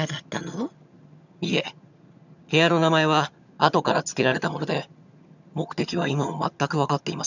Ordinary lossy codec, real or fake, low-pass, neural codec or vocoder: none; fake; 7.2 kHz; vocoder, 22.05 kHz, 80 mel bands, HiFi-GAN